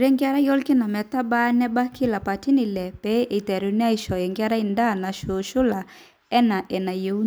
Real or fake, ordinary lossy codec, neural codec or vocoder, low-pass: real; none; none; none